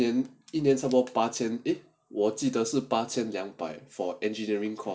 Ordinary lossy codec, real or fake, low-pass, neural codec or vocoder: none; real; none; none